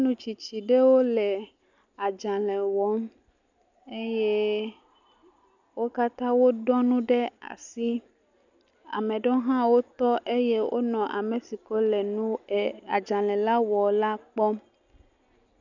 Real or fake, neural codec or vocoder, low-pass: real; none; 7.2 kHz